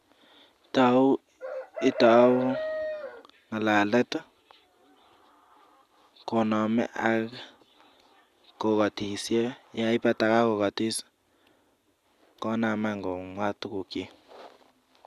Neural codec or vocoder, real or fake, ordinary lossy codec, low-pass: none; real; Opus, 64 kbps; 14.4 kHz